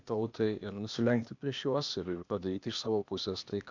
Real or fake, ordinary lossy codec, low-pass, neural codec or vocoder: fake; MP3, 64 kbps; 7.2 kHz; codec, 16 kHz, 0.8 kbps, ZipCodec